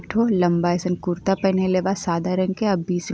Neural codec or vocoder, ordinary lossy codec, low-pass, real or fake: none; none; none; real